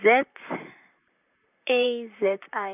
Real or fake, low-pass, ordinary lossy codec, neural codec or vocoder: fake; 3.6 kHz; none; vocoder, 44.1 kHz, 128 mel bands, Pupu-Vocoder